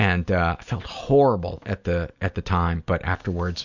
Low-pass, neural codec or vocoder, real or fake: 7.2 kHz; none; real